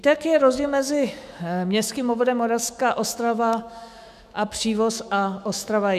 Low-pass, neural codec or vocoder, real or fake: 14.4 kHz; autoencoder, 48 kHz, 128 numbers a frame, DAC-VAE, trained on Japanese speech; fake